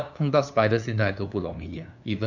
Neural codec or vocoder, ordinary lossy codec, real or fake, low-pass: codec, 16 kHz, 2 kbps, FunCodec, trained on LibriTTS, 25 frames a second; none; fake; 7.2 kHz